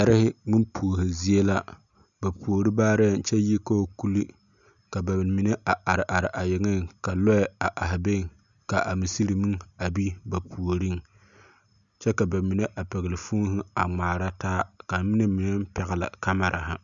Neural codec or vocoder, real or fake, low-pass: none; real; 7.2 kHz